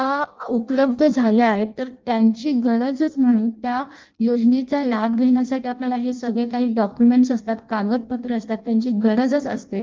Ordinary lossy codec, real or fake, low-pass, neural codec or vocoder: Opus, 32 kbps; fake; 7.2 kHz; codec, 16 kHz in and 24 kHz out, 0.6 kbps, FireRedTTS-2 codec